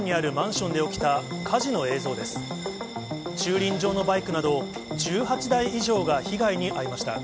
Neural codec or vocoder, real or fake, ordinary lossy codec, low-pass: none; real; none; none